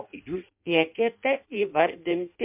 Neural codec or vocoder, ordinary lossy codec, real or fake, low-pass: codec, 16 kHz in and 24 kHz out, 0.6 kbps, FireRedTTS-2 codec; MP3, 32 kbps; fake; 3.6 kHz